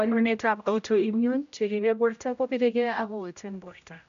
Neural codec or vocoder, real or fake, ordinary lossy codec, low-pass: codec, 16 kHz, 0.5 kbps, X-Codec, HuBERT features, trained on general audio; fake; AAC, 96 kbps; 7.2 kHz